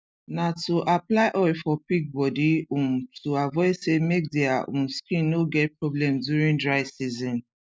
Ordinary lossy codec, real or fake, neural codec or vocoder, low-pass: none; real; none; none